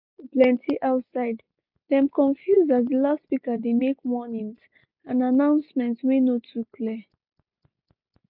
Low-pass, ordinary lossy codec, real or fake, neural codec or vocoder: 5.4 kHz; none; real; none